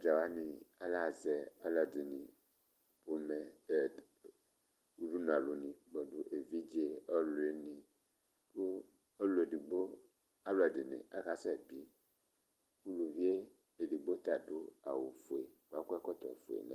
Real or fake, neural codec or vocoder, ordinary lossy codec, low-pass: real; none; Opus, 24 kbps; 14.4 kHz